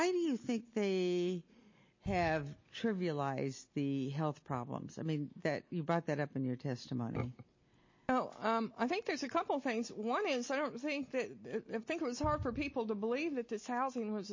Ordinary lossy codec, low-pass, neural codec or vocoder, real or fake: MP3, 32 kbps; 7.2 kHz; none; real